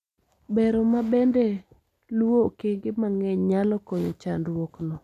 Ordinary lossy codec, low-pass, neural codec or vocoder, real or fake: none; 14.4 kHz; none; real